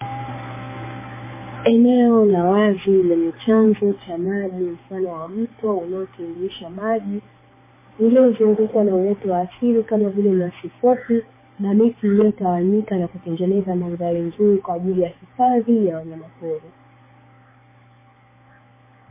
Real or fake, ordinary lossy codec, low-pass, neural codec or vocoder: fake; MP3, 16 kbps; 3.6 kHz; codec, 16 kHz, 4 kbps, X-Codec, HuBERT features, trained on balanced general audio